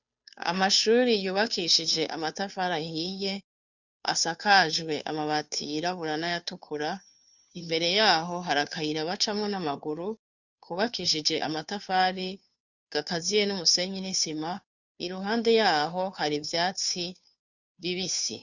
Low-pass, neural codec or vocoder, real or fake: 7.2 kHz; codec, 16 kHz, 2 kbps, FunCodec, trained on Chinese and English, 25 frames a second; fake